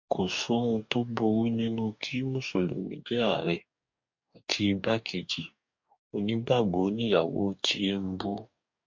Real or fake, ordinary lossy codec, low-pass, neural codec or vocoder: fake; MP3, 48 kbps; 7.2 kHz; codec, 44.1 kHz, 2.6 kbps, DAC